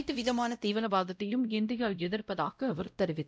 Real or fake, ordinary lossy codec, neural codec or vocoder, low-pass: fake; none; codec, 16 kHz, 0.5 kbps, X-Codec, WavLM features, trained on Multilingual LibriSpeech; none